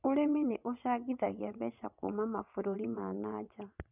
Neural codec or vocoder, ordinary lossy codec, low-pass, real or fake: vocoder, 22.05 kHz, 80 mel bands, WaveNeXt; none; 3.6 kHz; fake